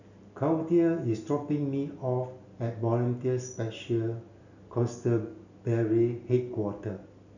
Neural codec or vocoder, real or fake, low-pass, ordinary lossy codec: none; real; 7.2 kHz; none